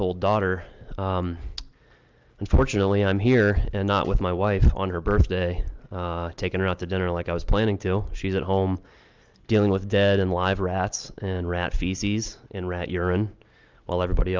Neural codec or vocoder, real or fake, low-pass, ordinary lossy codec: none; real; 7.2 kHz; Opus, 24 kbps